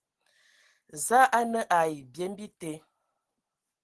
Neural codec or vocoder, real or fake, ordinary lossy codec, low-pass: none; real; Opus, 16 kbps; 10.8 kHz